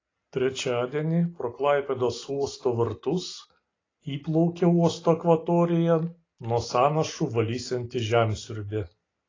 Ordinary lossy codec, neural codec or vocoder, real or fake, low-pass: AAC, 32 kbps; none; real; 7.2 kHz